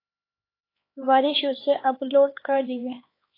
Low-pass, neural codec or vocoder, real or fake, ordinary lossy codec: 5.4 kHz; codec, 16 kHz, 4 kbps, X-Codec, HuBERT features, trained on LibriSpeech; fake; AAC, 24 kbps